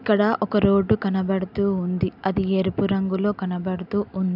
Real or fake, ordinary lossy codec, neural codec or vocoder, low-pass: real; AAC, 48 kbps; none; 5.4 kHz